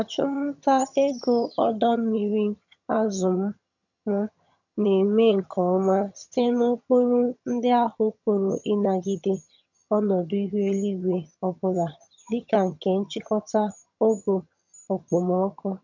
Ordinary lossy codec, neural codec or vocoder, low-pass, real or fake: none; vocoder, 22.05 kHz, 80 mel bands, HiFi-GAN; 7.2 kHz; fake